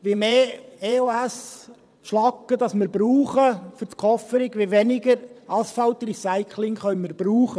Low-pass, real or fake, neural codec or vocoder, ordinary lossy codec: none; fake; vocoder, 22.05 kHz, 80 mel bands, WaveNeXt; none